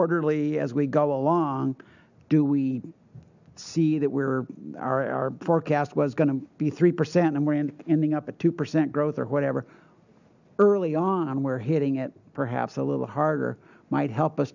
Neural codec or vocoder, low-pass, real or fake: none; 7.2 kHz; real